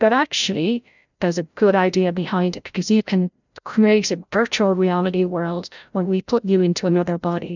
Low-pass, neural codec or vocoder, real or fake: 7.2 kHz; codec, 16 kHz, 0.5 kbps, FreqCodec, larger model; fake